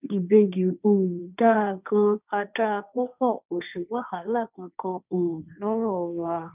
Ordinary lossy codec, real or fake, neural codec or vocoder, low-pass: none; fake; codec, 16 kHz, 1.1 kbps, Voila-Tokenizer; 3.6 kHz